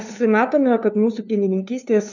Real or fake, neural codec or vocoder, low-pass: fake; codec, 16 kHz, 2 kbps, FunCodec, trained on LibriTTS, 25 frames a second; 7.2 kHz